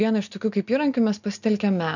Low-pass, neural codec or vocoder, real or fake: 7.2 kHz; none; real